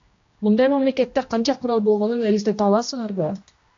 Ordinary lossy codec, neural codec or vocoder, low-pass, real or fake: AAC, 48 kbps; codec, 16 kHz, 1 kbps, X-Codec, HuBERT features, trained on general audio; 7.2 kHz; fake